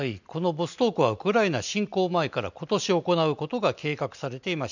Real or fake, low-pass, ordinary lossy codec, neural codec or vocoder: real; 7.2 kHz; none; none